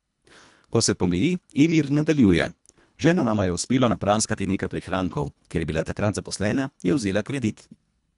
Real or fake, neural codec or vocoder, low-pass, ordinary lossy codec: fake; codec, 24 kHz, 1.5 kbps, HILCodec; 10.8 kHz; none